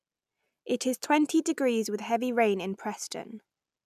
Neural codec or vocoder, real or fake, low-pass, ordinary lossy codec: none; real; 14.4 kHz; none